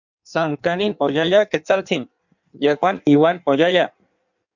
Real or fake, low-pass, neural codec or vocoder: fake; 7.2 kHz; codec, 16 kHz in and 24 kHz out, 1.1 kbps, FireRedTTS-2 codec